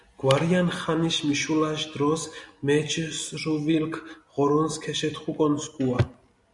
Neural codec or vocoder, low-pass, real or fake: none; 10.8 kHz; real